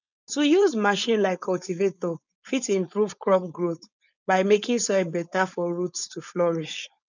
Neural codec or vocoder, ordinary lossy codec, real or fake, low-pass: codec, 16 kHz, 4.8 kbps, FACodec; none; fake; 7.2 kHz